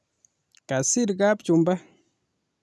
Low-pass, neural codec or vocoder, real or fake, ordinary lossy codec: none; none; real; none